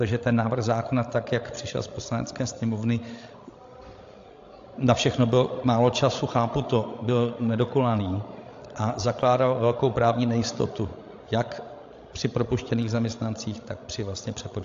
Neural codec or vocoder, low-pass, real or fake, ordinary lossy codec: codec, 16 kHz, 16 kbps, FreqCodec, larger model; 7.2 kHz; fake; AAC, 48 kbps